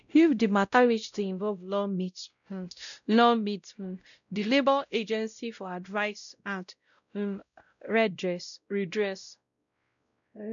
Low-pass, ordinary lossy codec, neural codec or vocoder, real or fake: 7.2 kHz; none; codec, 16 kHz, 0.5 kbps, X-Codec, WavLM features, trained on Multilingual LibriSpeech; fake